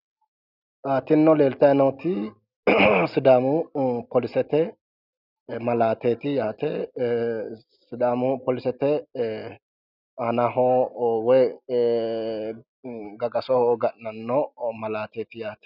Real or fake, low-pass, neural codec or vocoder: real; 5.4 kHz; none